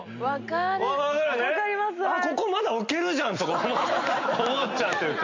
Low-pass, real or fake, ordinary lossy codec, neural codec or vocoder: 7.2 kHz; real; MP3, 48 kbps; none